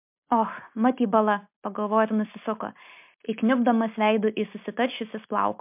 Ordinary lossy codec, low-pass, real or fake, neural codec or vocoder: MP3, 32 kbps; 3.6 kHz; real; none